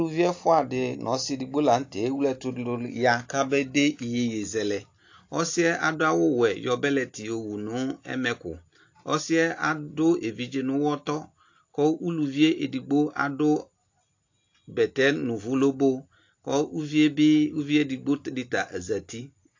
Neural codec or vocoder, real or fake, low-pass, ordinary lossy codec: none; real; 7.2 kHz; AAC, 48 kbps